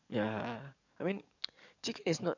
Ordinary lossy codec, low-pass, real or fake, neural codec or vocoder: none; 7.2 kHz; fake; codec, 44.1 kHz, 7.8 kbps, DAC